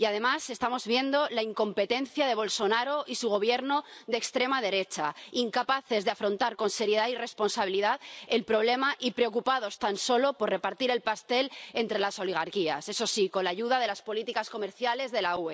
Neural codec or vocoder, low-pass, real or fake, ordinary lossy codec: none; none; real; none